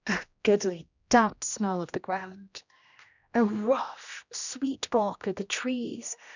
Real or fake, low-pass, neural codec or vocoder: fake; 7.2 kHz; codec, 16 kHz, 1 kbps, X-Codec, HuBERT features, trained on general audio